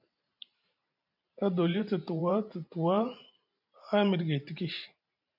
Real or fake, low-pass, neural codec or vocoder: fake; 5.4 kHz; vocoder, 44.1 kHz, 128 mel bands every 512 samples, BigVGAN v2